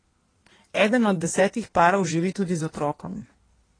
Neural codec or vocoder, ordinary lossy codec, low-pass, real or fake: codec, 16 kHz in and 24 kHz out, 1.1 kbps, FireRedTTS-2 codec; AAC, 32 kbps; 9.9 kHz; fake